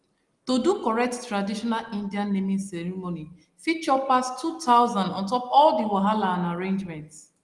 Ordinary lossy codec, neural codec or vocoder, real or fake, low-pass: Opus, 24 kbps; none; real; 10.8 kHz